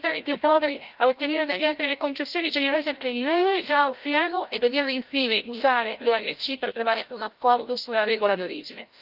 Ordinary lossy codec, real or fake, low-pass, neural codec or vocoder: Opus, 32 kbps; fake; 5.4 kHz; codec, 16 kHz, 0.5 kbps, FreqCodec, larger model